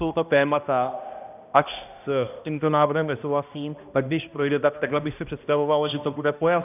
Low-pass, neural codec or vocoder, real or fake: 3.6 kHz; codec, 16 kHz, 1 kbps, X-Codec, HuBERT features, trained on balanced general audio; fake